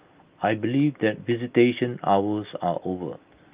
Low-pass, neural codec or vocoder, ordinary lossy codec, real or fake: 3.6 kHz; none; Opus, 32 kbps; real